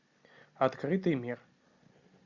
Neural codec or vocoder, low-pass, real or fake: none; 7.2 kHz; real